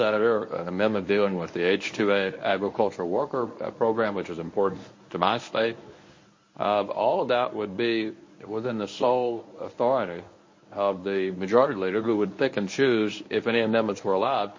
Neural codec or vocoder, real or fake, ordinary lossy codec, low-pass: codec, 24 kHz, 0.9 kbps, WavTokenizer, medium speech release version 1; fake; MP3, 32 kbps; 7.2 kHz